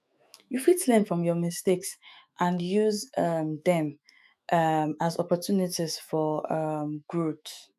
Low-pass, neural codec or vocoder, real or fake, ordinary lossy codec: 14.4 kHz; autoencoder, 48 kHz, 128 numbers a frame, DAC-VAE, trained on Japanese speech; fake; none